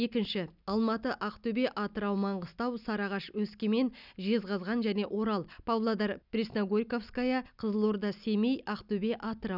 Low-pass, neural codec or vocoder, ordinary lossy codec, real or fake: 5.4 kHz; none; none; real